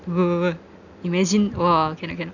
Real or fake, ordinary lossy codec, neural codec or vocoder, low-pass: real; none; none; 7.2 kHz